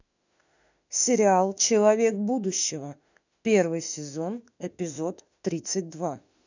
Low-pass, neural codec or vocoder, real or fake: 7.2 kHz; autoencoder, 48 kHz, 32 numbers a frame, DAC-VAE, trained on Japanese speech; fake